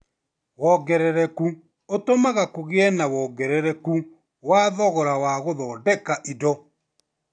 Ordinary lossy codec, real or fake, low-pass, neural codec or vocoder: AAC, 64 kbps; real; 9.9 kHz; none